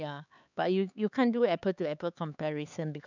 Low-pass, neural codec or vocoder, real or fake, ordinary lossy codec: 7.2 kHz; codec, 16 kHz, 4 kbps, X-Codec, HuBERT features, trained on LibriSpeech; fake; none